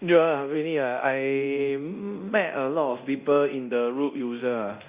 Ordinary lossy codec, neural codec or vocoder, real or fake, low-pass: Opus, 64 kbps; codec, 24 kHz, 0.9 kbps, DualCodec; fake; 3.6 kHz